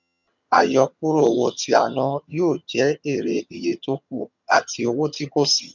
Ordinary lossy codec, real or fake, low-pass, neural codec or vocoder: none; fake; 7.2 kHz; vocoder, 22.05 kHz, 80 mel bands, HiFi-GAN